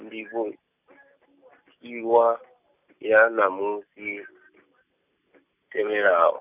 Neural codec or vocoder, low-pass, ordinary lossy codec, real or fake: none; 3.6 kHz; none; real